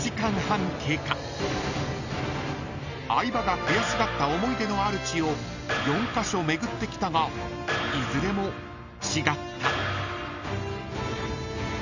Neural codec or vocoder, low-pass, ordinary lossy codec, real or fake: none; 7.2 kHz; none; real